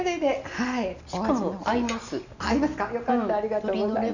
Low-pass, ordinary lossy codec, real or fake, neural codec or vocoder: 7.2 kHz; none; real; none